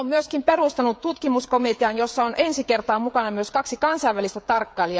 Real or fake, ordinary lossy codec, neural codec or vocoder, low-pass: fake; none; codec, 16 kHz, 16 kbps, FreqCodec, smaller model; none